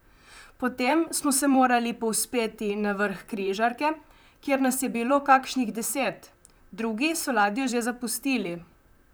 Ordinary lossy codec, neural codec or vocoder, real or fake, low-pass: none; vocoder, 44.1 kHz, 128 mel bands, Pupu-Vocoder; fake; none